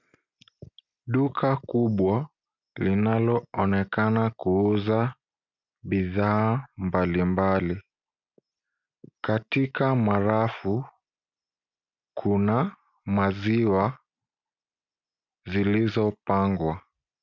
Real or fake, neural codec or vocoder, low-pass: real; none; 7.2 kHz